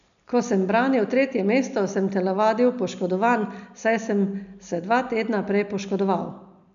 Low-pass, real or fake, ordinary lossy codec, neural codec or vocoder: 7.2 kHz; real; MP3, 96 kbps; none